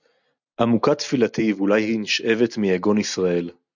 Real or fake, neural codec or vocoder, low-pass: real; none; 7.2 kHz